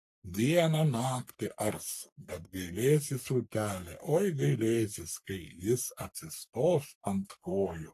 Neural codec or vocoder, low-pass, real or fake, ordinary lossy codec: codec, 44.1 kHz, 3.4 kbps, Pupu-Codec; 14.4 kHz; fake; AAC, 64 kbps